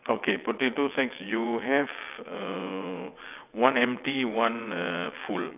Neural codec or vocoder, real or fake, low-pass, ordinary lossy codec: vocoder, 22.05 kHz, 80 mel bands, WaveNeXt; fake; 3.6 kHz; none